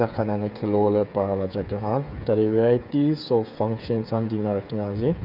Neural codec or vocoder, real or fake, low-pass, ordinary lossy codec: codec, 16 kHz, 8 kbps, FreqCodec, smaller model; fake; 5.4 kHz; none